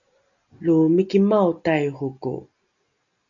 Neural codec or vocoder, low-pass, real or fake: none; 7.2 kHz; real